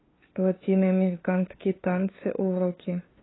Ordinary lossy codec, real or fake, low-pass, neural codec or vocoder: AAC, 16 kbps; fake; 7.2 kHz; codec, 16 kHz, 2 kbps, FunCodec, trained on LibriTTS, 25 frames a second